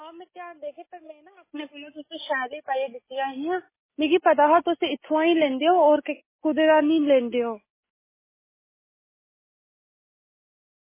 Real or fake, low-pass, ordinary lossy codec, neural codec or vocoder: real; 3.6 kHz; MP3, 16 kbps; none